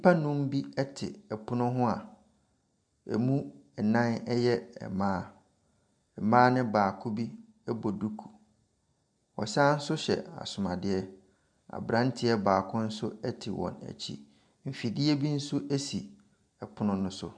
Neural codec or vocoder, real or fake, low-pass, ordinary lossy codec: none; real; 9.9 kHz; MP3, 96 kbps